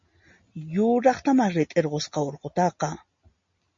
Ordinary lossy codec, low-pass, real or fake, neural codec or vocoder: MP3, 32 kbps; 7.2 kHz; real; none